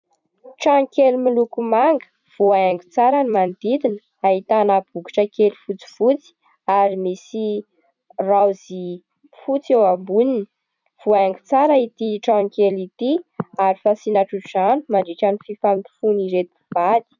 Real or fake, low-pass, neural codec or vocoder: fake; 7.2 kHz; vocoder, 44.1 kHz, 128 mel bands every 256 samples, BigVGAN v2